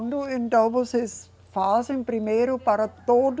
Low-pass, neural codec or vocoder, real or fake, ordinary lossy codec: none; none; real; none